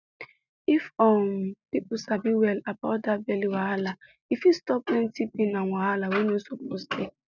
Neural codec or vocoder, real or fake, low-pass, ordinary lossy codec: none; real; 7.2 kHz; none